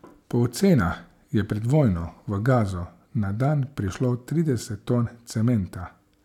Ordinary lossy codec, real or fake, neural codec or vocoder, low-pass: none; real; none; 19.8 kHz